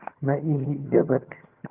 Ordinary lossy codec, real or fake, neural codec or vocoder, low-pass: Opus, 32 kbps; fake; vocoder, 22.05 kHz, 80 mel bands, HiFi-GAN; 3.6 kHz